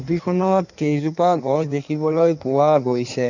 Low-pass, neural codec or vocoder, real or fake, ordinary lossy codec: 7.2 kHz; codec, 16 kHz in and 24 kHz out, 1.1 kbps, FireRedTTS-2 codec; fake; none